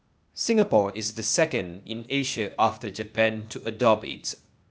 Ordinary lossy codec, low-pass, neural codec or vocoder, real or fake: none; none; codec, 16 kHz, 0.8 kbps, ZipCodec; fake